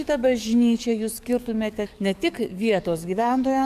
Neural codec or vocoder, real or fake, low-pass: codec, 44.1 kHz, 7.8 kbps, DAC; fake; 14.4 kHz